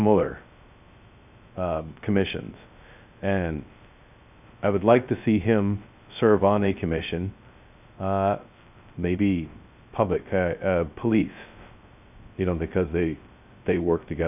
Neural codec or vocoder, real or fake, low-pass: codec, 16 kHz, 0.2 kbps, FocalCodec; fake; 3.6 kHz